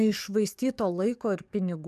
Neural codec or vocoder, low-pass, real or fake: codec, 44.1 kHz, 7.8 kbps, DAC; 14.4 kHz; fake